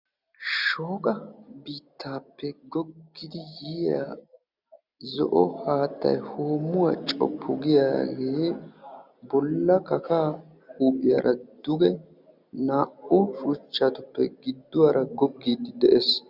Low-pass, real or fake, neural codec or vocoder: 5.4 kHz; real; none